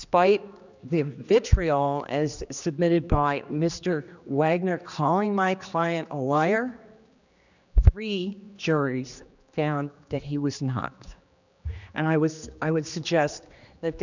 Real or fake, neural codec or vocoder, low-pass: fake; codec, 16 kHz, 2 kbps, X-Codec, HuBERT features, trained on general audio; 7.2 kHz